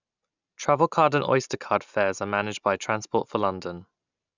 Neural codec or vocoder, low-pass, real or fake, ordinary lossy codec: none; 7.2 kHz; real; none